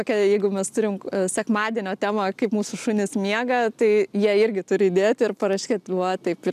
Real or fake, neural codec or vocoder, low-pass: real; none; 14.4 kHz